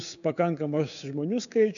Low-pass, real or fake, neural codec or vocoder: 7.2 kHz; fake; codec, 16 kHz, 6 kbps, DAC